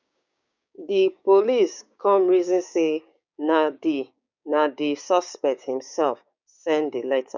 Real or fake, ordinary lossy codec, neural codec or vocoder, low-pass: fake; none; codec, 16 kHz, 6 kbps, DAC; 7.2 kHz